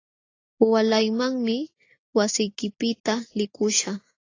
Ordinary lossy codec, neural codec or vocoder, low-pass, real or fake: AAC, 32 kbps; none; 7.2 kHz; real